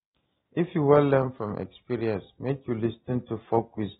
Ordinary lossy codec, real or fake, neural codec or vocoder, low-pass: AAC, 16 kbps; real; none; 19.8 kHz